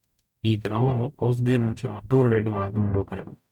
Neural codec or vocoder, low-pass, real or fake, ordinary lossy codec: codec, 44.1 kHz, 0.9 kbps, DAC; 19.8 kHz; fake; none